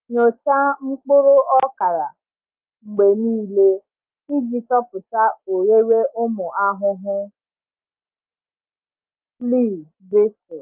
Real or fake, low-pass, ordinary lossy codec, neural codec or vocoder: real; 3.6 kHz; Opus, 24 kbps; none